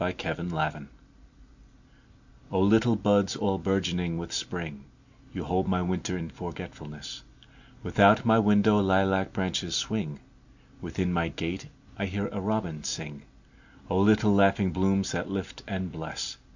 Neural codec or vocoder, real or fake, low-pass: none; real; 7.2 kHz